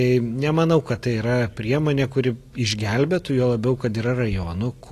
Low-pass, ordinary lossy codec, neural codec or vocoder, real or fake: 14.4 kHz; AAC, 48 kbps; none; real